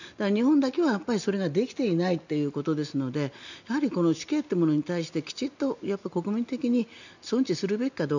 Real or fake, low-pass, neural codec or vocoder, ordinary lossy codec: real; 7.2 kHz; none; none